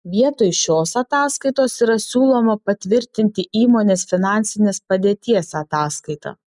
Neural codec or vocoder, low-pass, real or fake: none; 10.8 kHz; real